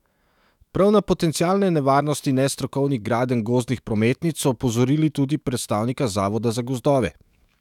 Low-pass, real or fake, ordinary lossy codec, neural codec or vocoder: 19.8 kHz; fake; none; autoencoder, 48 kHz, 128 numbers a frame, DAC-VAE, trained on Japanese speech